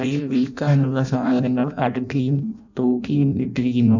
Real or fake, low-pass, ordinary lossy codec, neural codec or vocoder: fake; 7.2 kHz; none; codec, 16 kHz in and 24 kHz out, 0.6 kbps, FireRedTTS-2 codec